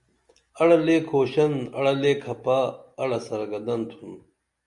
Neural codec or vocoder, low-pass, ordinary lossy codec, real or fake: none; 10.8 kHz; MP3, 96 kbps; real